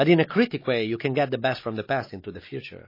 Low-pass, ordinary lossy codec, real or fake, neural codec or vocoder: 5.4 kHz; MP3, 24 kbps; real; none